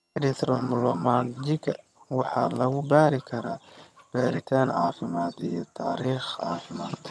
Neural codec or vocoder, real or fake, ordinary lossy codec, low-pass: vocoder, 22.05 kHz, 80 mel bands, HiFi-GAN; fake; none; none